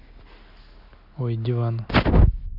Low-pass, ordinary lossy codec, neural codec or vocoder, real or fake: 5.4 kHz; none; none; real